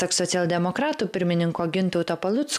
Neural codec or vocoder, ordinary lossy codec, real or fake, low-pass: none; MP3, 96 kbps; real; 14.4 kHz